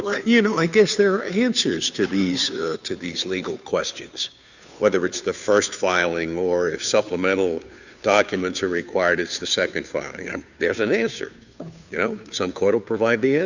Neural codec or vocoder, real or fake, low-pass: codec, 16 kHz, 2 kbps, FunCodec, trained on Chinese and English, 25 frames a second; fake; 7.2 kHz